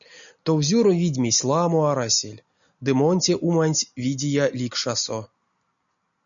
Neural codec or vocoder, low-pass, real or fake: none; 7.2 kHz; real